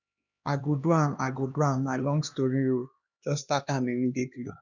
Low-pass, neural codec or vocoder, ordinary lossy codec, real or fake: 7.2 kHz; codec, 16 kHz, 2 kbps, X-Codec, HuBERT features, trained on LibriSpeech; none; fake